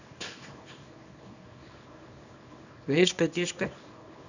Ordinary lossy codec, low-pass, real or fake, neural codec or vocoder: none; 7.2 kHz; fake; codec, 24 kHz, 0.9 kbps, WavTokenizer, small release